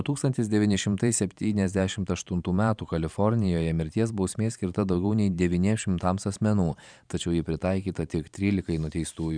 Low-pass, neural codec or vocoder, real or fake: 9.9 kHz; vocoder, 44.1 kHz, 128 mel bands every 512 samples, BigVGAN v2; fake